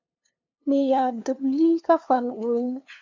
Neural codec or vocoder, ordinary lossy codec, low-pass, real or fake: codec, 16 kHz, 2 kbps, FunCodec, trained on LibriTTS, 25 frames a second; MP3, 64 kbps; 7.2 kHz; fake